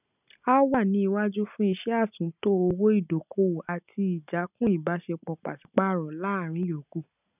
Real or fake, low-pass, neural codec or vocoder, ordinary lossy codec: real; 3.6 kHz; none; none